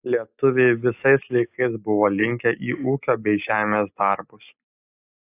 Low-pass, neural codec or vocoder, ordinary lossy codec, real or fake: 3.6 kHz; none; AAC, 24 kbps; real